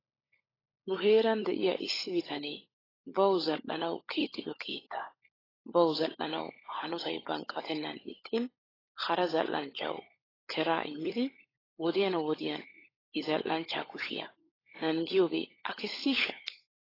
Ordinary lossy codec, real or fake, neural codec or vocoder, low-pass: AAC, 24 kbps; fake; codec, 16 kHz, 16 kbps, FunCodec, trained on LibriTTS, 50 frames a second; 5.4 kHz